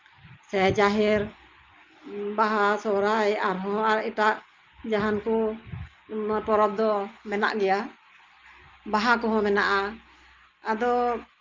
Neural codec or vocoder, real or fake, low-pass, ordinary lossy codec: none; real; 7.2 kHz; Opus, 32 kbps